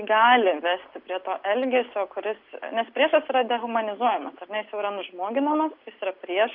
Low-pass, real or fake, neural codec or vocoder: 5.4 kHz; real; none